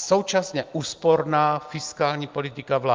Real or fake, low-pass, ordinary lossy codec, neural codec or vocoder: real; 7.2 kHz; Opus, 24 kbps; none